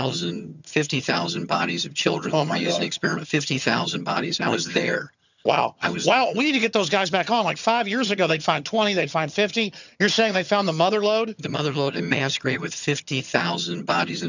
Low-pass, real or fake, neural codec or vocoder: 7.2 kHz; fake; vocoder, 22.05 kHz, 80 mel bands, HiFi-GAN